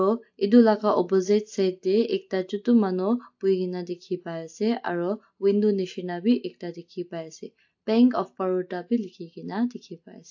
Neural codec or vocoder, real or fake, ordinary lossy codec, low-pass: none; real; AAC, 48 kbps; 7.2 kHz